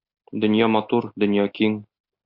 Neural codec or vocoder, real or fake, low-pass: none; real; 5.4 kHz